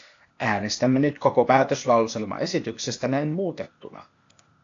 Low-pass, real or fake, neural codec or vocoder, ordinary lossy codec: 7.2 kHz; fake; codec, 16 kHz, 0.8 kbps, ZipCodec; AAC, 48 kbps